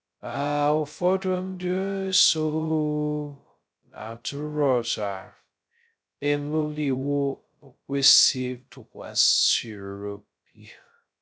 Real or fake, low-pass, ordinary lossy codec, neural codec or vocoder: fake; none; none; codec, 16 kHz, 0.2 kbps, FocalCodec